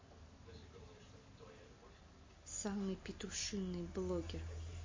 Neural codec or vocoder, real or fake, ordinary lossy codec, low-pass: none; real; MP3, 32 kbps; 7.2 kHz